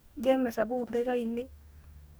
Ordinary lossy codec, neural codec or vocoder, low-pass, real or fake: none; codec, 44.1 kHz, 2.6 kbps, DAC; none; fake